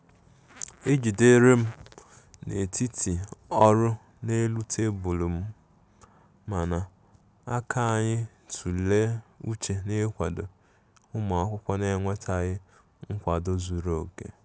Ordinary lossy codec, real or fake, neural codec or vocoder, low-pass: none; real; none; none